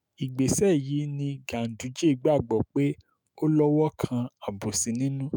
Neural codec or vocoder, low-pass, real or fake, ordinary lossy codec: autoencoder, 48 kHz, 128 numbers a frame, DAC-VAE, trained on Japanese speech; none; fake; none